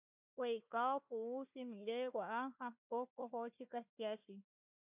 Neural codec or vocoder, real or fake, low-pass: codec, 16 kHz, 1 kbps, FunCodec, trained on Chinese and English, 50 frames a second; fake; 3.6 kHz